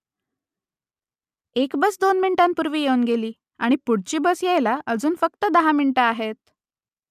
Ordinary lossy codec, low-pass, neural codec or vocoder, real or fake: none; 14.4 kHz; none; real